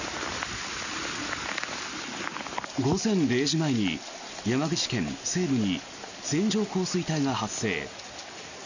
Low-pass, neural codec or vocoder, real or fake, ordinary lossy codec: 7.2 kHz; none; real; none